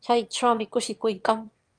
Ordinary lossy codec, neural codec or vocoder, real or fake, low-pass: Opus, 32 kbps; autoencoder, 22.05 kHz, a latent of 192 numbers a frame, VITS, trained on one speaker; fake; 9.9 kHz